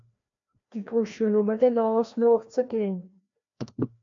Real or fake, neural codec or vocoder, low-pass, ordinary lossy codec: fake; codec, 16 kHz, 1 kbps, FreqCodec, larger model; 7.2 kHz; MP3, 64 kbps